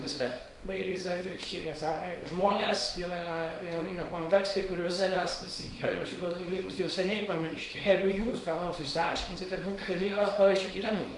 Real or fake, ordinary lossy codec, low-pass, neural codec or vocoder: fake; Opus, 64 kbps; 10.8 kHz; codec, 24 kHz, 0.9 kbps, WavTokenizer, small release